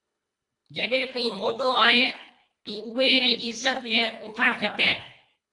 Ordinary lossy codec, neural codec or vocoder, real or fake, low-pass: AAC, 48 kbps; codec, 24 kHz, 1.5 kbps, HILCodec; fake; 10.8 kHz